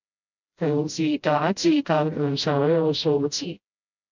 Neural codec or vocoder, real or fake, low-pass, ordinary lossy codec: codec, 16 kHz, 0.5 kbps, FreqCodec, smaller model; fake; 7.2 kHz; MP3, 64 kbps